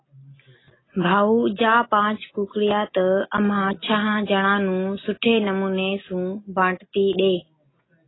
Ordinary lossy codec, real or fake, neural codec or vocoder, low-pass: AAC, 16 kbps; real; none; 7.2 kHz